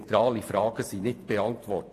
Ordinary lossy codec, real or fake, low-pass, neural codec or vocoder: AAC, 64 kbps; fake; 14.4 kHz; vocoder, 48 kHz, 128 mel bands, Vocos